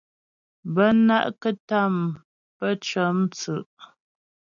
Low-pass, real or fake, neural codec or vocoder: 7.2 kHz; real; none